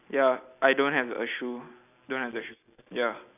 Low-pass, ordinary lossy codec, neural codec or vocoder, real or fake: 3.6 kHz; none; none; real